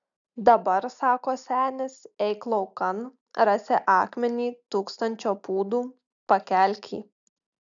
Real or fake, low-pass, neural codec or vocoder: real; 7.2 kHz; none